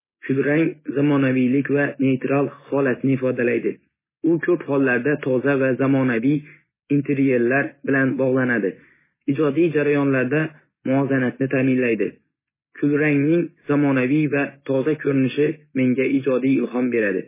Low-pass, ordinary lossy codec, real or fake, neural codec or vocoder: 3.6 kHz; MP3, 16 kbps; real; none